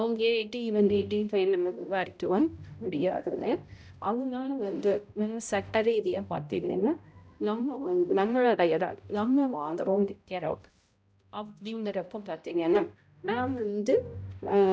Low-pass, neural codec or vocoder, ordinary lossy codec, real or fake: none; codec, 16 kHz, 0.5 kbps, X-Codec, HuBERT features, trained on balanced general audio; none; fake